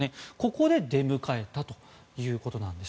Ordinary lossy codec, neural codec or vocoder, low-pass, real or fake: none; none; none; real